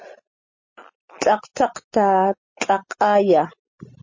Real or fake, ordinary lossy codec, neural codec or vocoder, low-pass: real; MP3, 32 kbps; none; 7.2 kHz